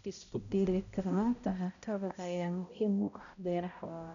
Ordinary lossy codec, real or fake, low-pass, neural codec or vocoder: none; fake; 7.2 kHz; codec, 16 kHz, 0.5 kbps, X-Codec, HuBERT features, trained on balanced general audio